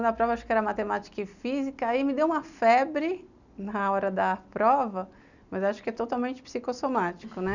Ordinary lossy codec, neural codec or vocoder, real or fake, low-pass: none; none; real; 7.2 kHz